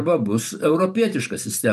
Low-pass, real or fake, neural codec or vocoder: 14.4 kHz; fake; vocoder, 44.1 kHz, 128 mel bands every 512 samples, BigVGAN v2